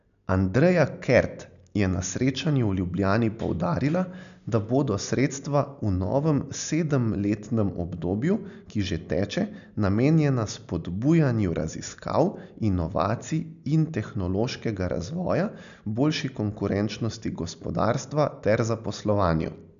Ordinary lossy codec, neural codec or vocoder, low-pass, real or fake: none; none; 7.2 kHz; real